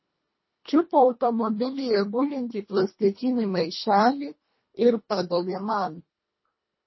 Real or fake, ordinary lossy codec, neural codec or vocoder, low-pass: fake; MP3, 24 kbps; codec, 24 kHz, 1.5 kbps, HILCodec; 7.2 kHz